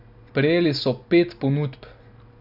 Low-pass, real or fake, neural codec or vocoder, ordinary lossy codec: 5.4 kHz; real; none; none